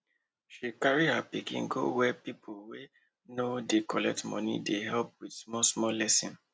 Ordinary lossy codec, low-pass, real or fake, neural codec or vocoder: none; none; real; none